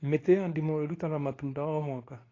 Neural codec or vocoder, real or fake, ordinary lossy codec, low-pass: codec, 24 kHz, 0.9 kbps, WavTokenizer, medium speech release version 2; fake; AAC, 32 kbps; 7.2 kHz